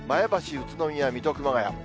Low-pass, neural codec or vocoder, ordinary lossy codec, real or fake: none; none; none; real